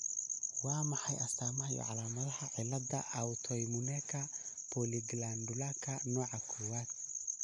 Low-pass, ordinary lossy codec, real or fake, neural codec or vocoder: 10.8 kHz; MP3, 64 kbps; real; none